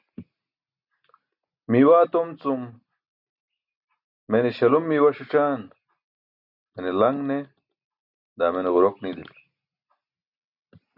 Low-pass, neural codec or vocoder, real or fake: 5.4 kHz; none; real